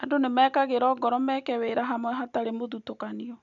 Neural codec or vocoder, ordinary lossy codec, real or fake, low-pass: none; none; real; 7.2 kHz